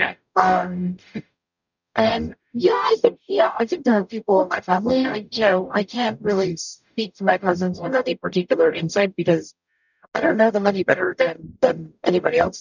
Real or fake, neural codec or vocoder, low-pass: fake; codec, 44.1 kHz, 0.9 kbps, DAC; 7.2 kHz